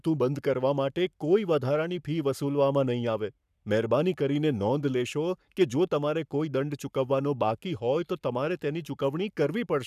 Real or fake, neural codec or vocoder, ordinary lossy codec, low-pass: fake; codec, 44.1 kHz, 7.8 kbps, Pupu-Codec; none; 14.4 kHz